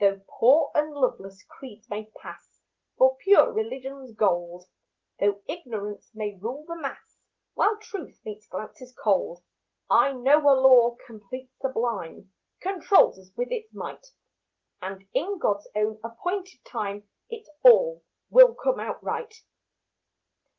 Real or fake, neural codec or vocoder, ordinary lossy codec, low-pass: real; none; Opus, 16 kbps; 7.2 kHz